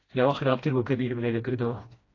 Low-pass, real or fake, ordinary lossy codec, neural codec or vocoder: 7.2 kHz; fake; AAC, 32 kbps; codec, 16 kHz, 1 kbps, FreqCodec, smaller model